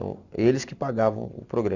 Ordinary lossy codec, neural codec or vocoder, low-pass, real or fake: none; none; 7.2 kHz; real